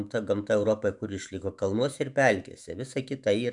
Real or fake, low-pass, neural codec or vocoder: fake; 10.8 kHz; autoencoder, 48 kHz, 128 numbers a frame, DAC-VAE, trained on Japanese speech